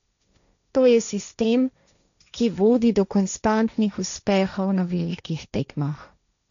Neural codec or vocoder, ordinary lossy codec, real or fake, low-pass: codec, 16 kHz, 1.1 kbps, Voila-Tokenizer; MP3, 96 kbps; fake; 7.2 kHz